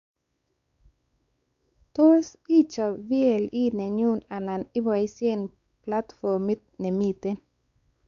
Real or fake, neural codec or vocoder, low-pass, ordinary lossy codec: fake; codec, 16 kHz, 4 kbps, X-Codec, WavLM features, trained on Multilingual LibriSpeech; 7.2 kHz; Opus, 64 kbps